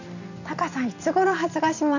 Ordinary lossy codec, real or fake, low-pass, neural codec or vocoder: none; real; 7.2 kHz; none